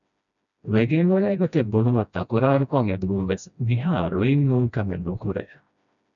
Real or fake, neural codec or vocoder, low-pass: fake; codec, 16 kHz, 1 kbps, FreqCodec, smaller model; 7.2 kHz